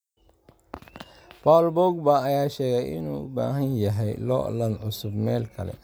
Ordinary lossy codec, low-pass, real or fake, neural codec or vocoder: none; none; real; none